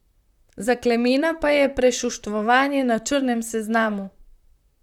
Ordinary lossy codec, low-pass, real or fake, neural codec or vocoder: none; 19.8 kHz; fake; vocoder, 44.1 kHz, 128 mel bands, Pupu-Vocoder